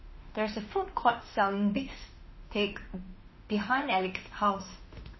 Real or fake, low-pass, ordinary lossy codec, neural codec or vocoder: fake; 7.2 kHz; MP3, 24 kbps; autoencoder, 48 kHz, 32 numbers a frame, DAC-VAE, trained on Japanese speech